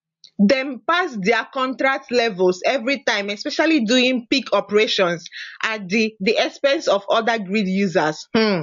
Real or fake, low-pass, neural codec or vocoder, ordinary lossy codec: real; 7.2 kHz; none; MP3, 48 kbps